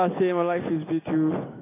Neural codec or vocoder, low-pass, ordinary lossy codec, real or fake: none; 3.6 kHz; AAC, 16 kbps; real